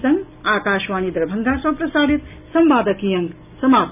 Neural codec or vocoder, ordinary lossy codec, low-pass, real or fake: none; none; 3.6 kHz; real